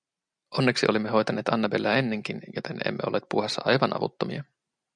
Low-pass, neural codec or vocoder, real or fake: 9.9 kHz; none; real